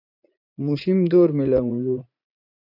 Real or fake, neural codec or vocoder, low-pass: fake; vocoder, 44.1 kHz, 80 mel bands, Vocos; 5.4 kHz